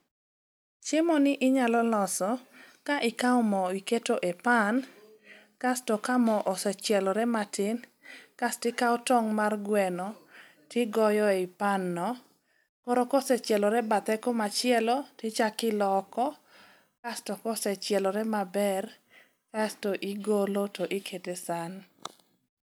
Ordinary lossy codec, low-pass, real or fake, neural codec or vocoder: none; none; real; none